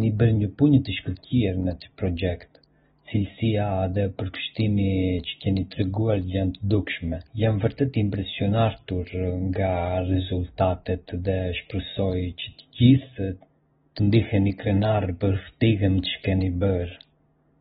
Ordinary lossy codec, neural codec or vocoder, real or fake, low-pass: AAC, 16 kbps; none; real; 19.8 kHz